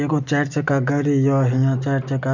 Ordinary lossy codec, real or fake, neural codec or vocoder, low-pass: none; real; none; 7.2 kHz